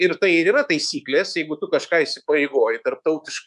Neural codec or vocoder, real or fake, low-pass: codec, 24 kHz, 3.1 kbps, DualCodec; fake; 10.8 kHz